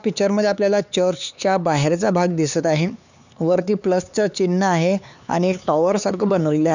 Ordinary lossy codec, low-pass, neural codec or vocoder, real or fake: none; 7.2 kHz; codec, 16 kHz, 4 kbps, X-Codec, HuBERT features, trained on LibriSpeech; fake